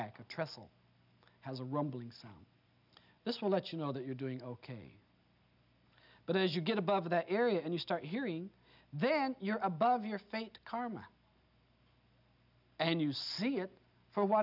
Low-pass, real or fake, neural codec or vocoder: 5.4 kHz; real; none